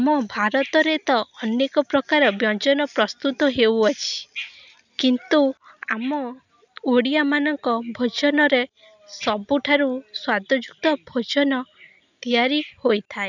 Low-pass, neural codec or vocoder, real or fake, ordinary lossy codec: 7.2 kHz; none; real; none